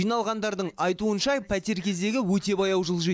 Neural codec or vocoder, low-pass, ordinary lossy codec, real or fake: none; none; none; real